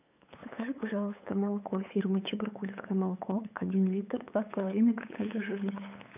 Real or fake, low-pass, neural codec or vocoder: fake; 3.6 kHz; codec, 16 kHz, 8 kbps, FunCodec, trained on LibriTTS, 25 frames a second